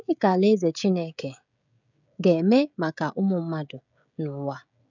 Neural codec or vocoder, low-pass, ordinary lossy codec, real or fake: codec, 16 kHz, 16 kbps, FreqCodec, smaller model; 7.2 kHz; none; fake